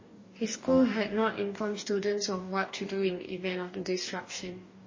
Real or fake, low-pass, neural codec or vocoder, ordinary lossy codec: fake; 7.2 kHz; codec, 44.1 kHz, 2.6 kbps, DAC; MP3, 32 kbps